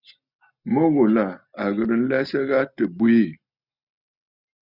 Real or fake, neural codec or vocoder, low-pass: real; none; 5.4 kHz